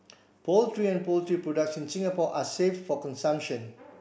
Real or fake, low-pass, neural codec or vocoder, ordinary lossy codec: real; none; none; none